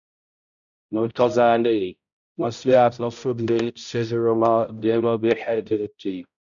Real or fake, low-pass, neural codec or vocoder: fake; 7.2 kHz; codec, 16 kHz, 0.5 kbps, X-Codec, HuBERT features, trained on balanced general audio